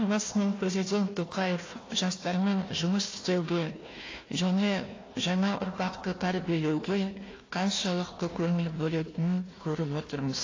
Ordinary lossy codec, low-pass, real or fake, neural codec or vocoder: AAC, 32 kbps; 7.2 kHz; fake; codec, 16 kHz, 1 kbps, FunCodec, trained on Chinese and English, 50 frames a second